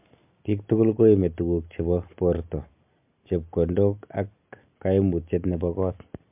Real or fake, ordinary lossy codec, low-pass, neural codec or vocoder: real; MP3, 32 kbps; 3.6 kHz; none